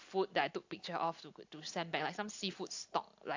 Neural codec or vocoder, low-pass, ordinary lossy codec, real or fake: none; 7.2 kHz; AAC, 48 kbps; real